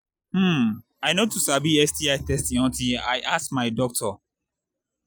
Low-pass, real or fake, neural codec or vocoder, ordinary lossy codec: none; real; none; none